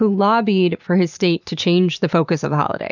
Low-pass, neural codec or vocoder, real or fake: 7.2 kHz; vocoder, 44.1 kHz, 128 mel bands every 512 samples, BigVGAN v2; fake